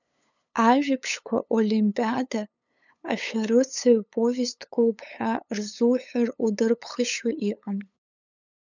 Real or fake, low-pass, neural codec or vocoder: fake; 7.2 kHz; codec, 16 kHz, 8 kbps, FunCodec, trained on LibriTTS, 25 frames a second